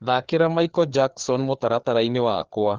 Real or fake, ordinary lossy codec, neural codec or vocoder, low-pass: fake; Opus, 16 kbps; codec, 16 kHz, 4 kbps, FreqCodec, larger model; 7.2 kHz